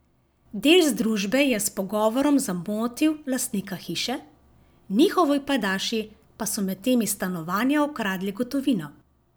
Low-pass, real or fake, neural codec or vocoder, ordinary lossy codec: none; real; none; none